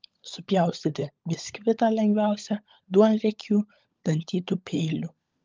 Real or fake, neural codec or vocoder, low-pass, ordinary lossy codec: fake; codec, 16 kHz, 16 kbps, FunCodec, trained on LibriTTS, 50 frames a second; 7.2 kHz; Opus, 24 kbps